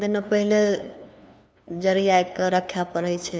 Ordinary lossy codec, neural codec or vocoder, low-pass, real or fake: none; codec, 16 kHz, 2 kbps, FunCodec, trained on LibriTTS, 25 frames a second; none; fake